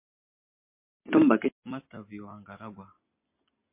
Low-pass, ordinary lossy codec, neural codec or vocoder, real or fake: 3.6 kHz; MP3, 24 kbps; none; real